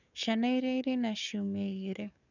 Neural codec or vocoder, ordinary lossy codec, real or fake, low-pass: codec, 44.1 kHz, 7.8 kbps, DAC; none; fake; 7.2 kHz